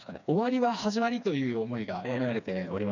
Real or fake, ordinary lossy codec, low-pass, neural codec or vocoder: fake; none; 7.2 kHz; codec, 16 kHz, 2 kbps, FreqCodec, smaller model